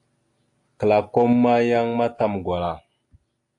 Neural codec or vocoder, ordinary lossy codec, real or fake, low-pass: none; AAC, 48 kbps; real; 10.8 kHz